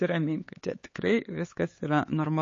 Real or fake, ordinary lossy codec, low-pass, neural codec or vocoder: fake; MP3, 32 kbps; 7.2 kHz; codec, 16 kHz, 4 kbps, X-Codec, HuBERT features, trained on LibriSpeech